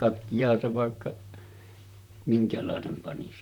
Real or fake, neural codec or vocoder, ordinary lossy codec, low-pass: fake; vocoder, 44.1 kHz, 128 mel bands, Pupu-Vocoder; none; 19.8 kHz